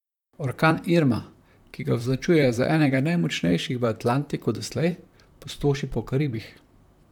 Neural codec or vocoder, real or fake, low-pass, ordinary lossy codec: vocoder, 44.1 kHz, 128 mel bands, Pupu-Vocoder; fake; 19.8 kHz; none